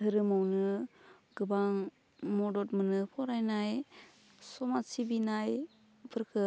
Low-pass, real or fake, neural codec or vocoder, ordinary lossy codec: none; real; none; none